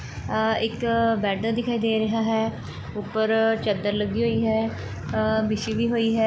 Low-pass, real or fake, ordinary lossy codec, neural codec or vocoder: none; real; none; none